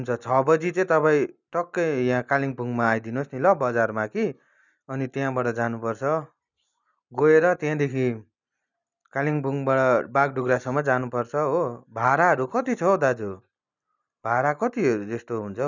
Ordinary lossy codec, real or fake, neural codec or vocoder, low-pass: none; real; none; 7.2 kHz